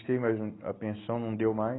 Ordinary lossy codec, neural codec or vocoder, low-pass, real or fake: AAC, 16 kbps; none; 7.2 kHz; real